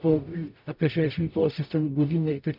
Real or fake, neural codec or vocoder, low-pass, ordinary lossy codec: fake; codec, 44.1 kHz, 0.9 kbps, DAC; 5.4 kHz; MP3, 48 kbps